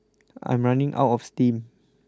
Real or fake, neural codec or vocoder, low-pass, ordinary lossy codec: real; none; none; none